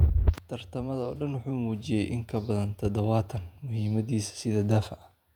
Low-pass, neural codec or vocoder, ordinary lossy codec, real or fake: 19.8 kHz; vocoder, 48 kHz, 128 mel bands, Vocos; none; fake